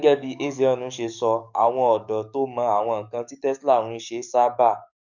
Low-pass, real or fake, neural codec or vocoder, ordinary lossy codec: 7.2 kHz; fake; codec, 44.1 kHz, 7.8 kbps, DAC; none